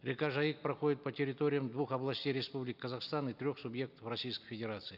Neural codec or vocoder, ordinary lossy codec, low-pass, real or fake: none; none; 5.4 kHz; real